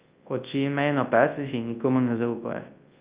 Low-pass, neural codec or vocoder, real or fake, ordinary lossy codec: 3.6 kHz; codec, 24 kHz, 0.9 kbps, WavTokenizer, large speech release; fake; Opus, 32 kbps